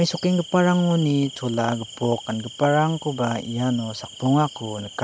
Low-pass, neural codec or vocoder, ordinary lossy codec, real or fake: none; none; none; real